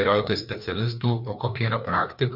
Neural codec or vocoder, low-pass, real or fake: codec, 16 kHz, 2 kbps, FreqCodec, larger model; 5.4 kHz; fake